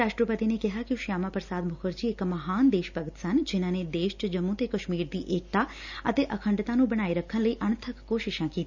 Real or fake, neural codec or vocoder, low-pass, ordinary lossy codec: real; none; 7.2 kHz; none